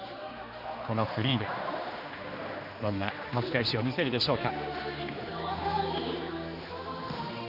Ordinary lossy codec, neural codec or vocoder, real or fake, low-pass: none; codec, 16 kHz, 2 kbps, X-Codec, HuBERT features, trained on general audio; fake; 5.4 kHz